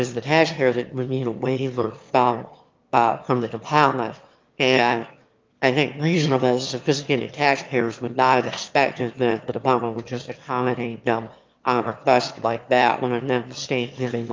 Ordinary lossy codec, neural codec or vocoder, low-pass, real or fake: Opus, 32 kbps; autoencoder, 22.05 kHz, a latent of 192 numbers a frame, VITS, trained on one speaker; 7.2 kHz; fake